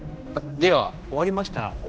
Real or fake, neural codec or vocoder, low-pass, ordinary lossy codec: fake; codec, 16 kHz, 1 kbps, X-Codec, HuBERT features, trained on general audio; none; none